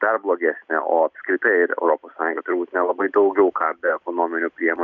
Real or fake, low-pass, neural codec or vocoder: real; 7.2 kHz; none